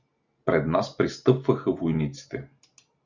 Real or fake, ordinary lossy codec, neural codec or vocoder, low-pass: real; Opus, 64 kbps; none; 7.2 kHz